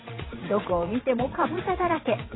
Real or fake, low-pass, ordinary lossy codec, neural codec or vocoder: fake; 7.2 kHz; AAC, 16 kbps; vocoder, 22.05 kHz, 80 mel bands, WaveNeXt